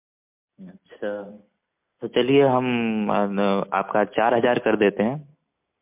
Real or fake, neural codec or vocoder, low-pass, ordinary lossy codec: real; none; 3.6 kHz; MP3, 32 kbps